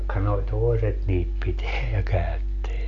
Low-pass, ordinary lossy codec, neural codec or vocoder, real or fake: 7.2 kHz; none; none; real